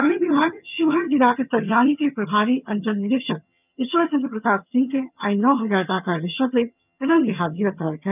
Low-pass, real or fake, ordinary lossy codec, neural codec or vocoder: 3.6 kHz; fake; none; vocoder, 22.05 kHz, 80 mel bands, HiFi-GAN